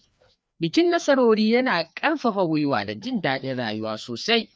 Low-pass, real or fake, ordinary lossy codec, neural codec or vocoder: none; fake; none; codec, 16 kHz, 2 kbps, FreqCodec, larger model